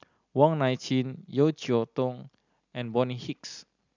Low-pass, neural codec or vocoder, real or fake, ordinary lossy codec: 7.2 kHz; none; real; none